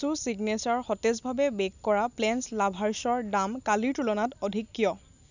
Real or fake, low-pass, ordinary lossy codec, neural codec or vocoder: real; 7.2 kHz; none; none